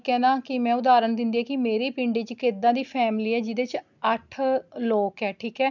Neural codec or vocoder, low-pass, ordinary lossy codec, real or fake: none; 7.2 kHz; none; real